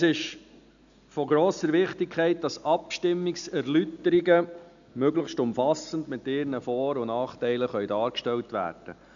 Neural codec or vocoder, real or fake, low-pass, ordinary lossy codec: none; real; 7.2 kHz; none